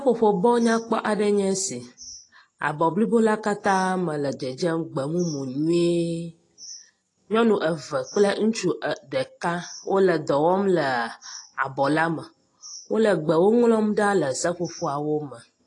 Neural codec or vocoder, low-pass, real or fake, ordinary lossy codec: none; 10.8 kHz; real; AAC, 32 kbps